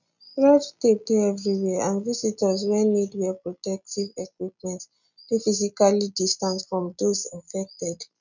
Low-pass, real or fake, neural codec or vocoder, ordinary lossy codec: 7.2 kHz; real; none; none